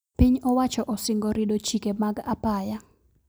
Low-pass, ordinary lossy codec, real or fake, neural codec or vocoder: none; none; real; none